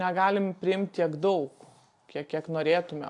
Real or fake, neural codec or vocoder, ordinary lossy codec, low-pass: real; none; AAC, 64 kbps; 10.8 kHz